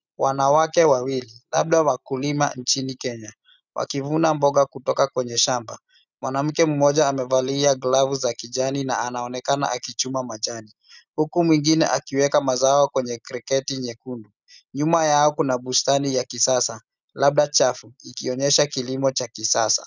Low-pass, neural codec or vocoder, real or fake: 7.2 kHz; none; real